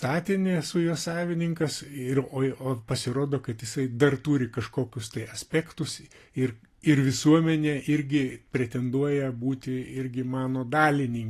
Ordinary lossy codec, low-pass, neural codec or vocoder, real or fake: AAC, 48 kbps; 14.4 kHz; none; real